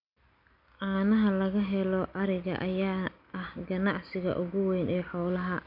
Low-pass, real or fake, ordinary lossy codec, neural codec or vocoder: 5.4 kHz; real; none; none